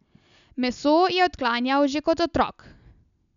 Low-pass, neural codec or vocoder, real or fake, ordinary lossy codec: 7.2 kHz; none; real; none